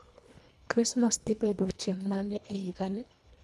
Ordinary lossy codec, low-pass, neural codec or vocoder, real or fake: none; none; codec, 24 kHz, 1.5 kbps, HILCodec; fake